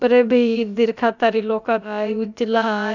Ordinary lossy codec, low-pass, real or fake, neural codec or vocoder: none; 7.2 kHz; fake; codec, 16 kHz, about 1 kbps, DyCAST, with the encoder's durations